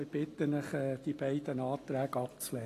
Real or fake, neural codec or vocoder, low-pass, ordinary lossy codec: real; none; 14.4 kHz; AAC, 48 kbps